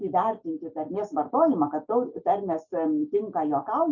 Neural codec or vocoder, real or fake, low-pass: none; real; 7.2 kHz